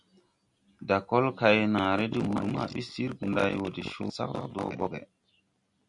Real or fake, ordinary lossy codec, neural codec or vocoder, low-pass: real; MP3, 96 kbps; none; 10.8 kHz